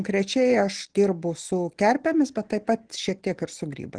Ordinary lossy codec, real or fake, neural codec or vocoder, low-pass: Opus, 16 kbps; real; none; 9.9 kHz